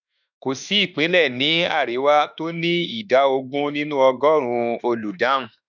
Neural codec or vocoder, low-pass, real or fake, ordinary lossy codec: autoencoder, 48 kHz, 32 numbers a frame, DAC-VAE, trained on Japanese speech; 7.2 kHz; fake; none